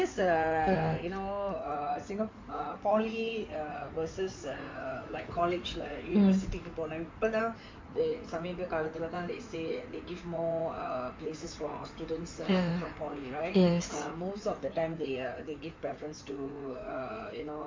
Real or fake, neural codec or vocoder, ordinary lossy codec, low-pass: fake; codec, 16 kHz in and 24 kHz out, 2.2 kbps, FireRedTTS-2 codec; MP3, 64 kbps; 7.2 kHz